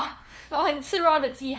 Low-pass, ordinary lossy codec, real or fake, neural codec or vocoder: none; none; fake; codec, 16 kHz, 2 kbps, FunCodec, trained on LibriTTS, 25 frames a second